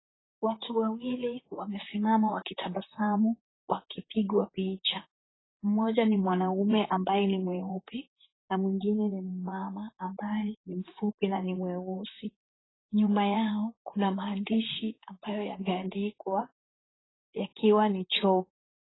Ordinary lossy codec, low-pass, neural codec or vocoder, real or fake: AAC, 16 kbps; 7.2 kHz; vocoder, 44.1 kHz, 128 mel bands, Pupu-Vocoder; fake